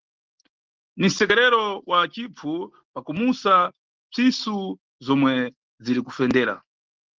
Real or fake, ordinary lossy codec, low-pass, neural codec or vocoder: real; Opus, 16 kbps; 7.2 kHz; none